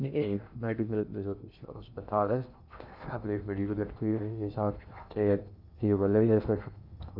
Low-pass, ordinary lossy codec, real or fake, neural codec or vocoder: 5.4 kHz; none; fake; codec, 16 kHz in and 24 kHz out, 0.6 kbps, FocalCodec, streaming, 2048 codes